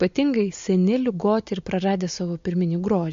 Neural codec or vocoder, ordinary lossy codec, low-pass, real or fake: none; MP3, 48 kbps; 7.2 kHz; real